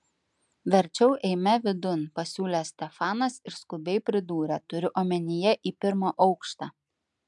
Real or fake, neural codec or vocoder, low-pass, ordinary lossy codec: real; none; 10.8 kHz; MP3, 96 kbps